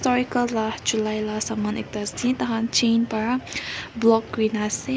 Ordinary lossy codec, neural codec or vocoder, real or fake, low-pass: none; none; real; none